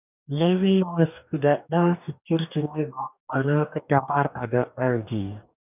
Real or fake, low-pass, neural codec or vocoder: fake; 3.6 kHz; codec, 44.1 kHz, 2.6 kbps, DAC